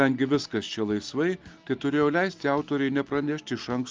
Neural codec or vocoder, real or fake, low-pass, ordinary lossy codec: none; real; 7.2 kHz; Opus, 32 kbps